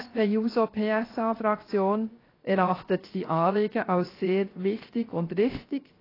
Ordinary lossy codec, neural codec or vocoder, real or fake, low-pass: AAC, 24 kbps; codec, 16 kHz, 0.3 kbps, FocalCodec; fake; 5.4 kHz